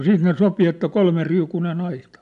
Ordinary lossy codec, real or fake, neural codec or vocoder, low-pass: none; real; none; 10.8 kHz